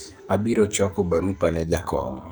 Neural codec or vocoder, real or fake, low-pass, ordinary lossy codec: codec, 44.1 kHz, 2.6 kbps, SNAC; fake; none; none